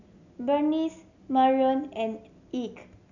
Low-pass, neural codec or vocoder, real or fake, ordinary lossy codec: 7.2 kHz; none; real; none